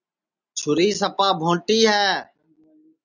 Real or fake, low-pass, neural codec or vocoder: real; 7.2 kHz; none